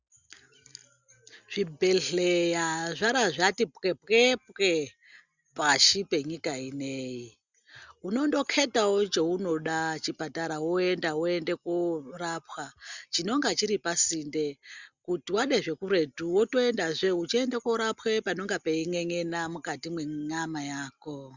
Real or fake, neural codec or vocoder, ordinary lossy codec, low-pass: real; none; Opus, 64 kbps; 7.2 kHz